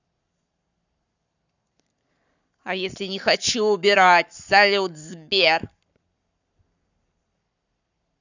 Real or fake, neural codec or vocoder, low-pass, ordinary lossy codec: fake; codec, 44.1 kHz, 7.8 kbps, Pupu-Codec; 7.2 kHz; none